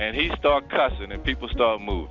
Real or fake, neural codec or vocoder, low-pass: real; none; 7.2 kHz